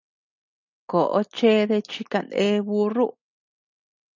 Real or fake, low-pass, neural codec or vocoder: real; 7.2 kHz; none